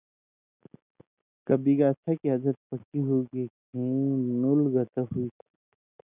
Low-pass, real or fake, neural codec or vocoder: 3.6 kHz; real; none